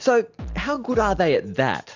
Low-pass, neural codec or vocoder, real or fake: 7.2 kHz; none; real